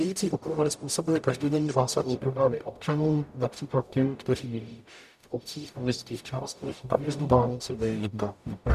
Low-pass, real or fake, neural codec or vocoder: 14.4 kHz; fake; codec, 44.1 kHz, 0.9 kbps, DAC